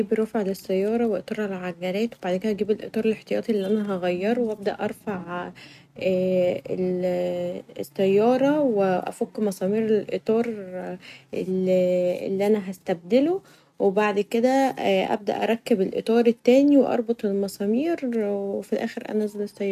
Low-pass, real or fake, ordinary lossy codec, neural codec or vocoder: 14.4 kHz; real; none; none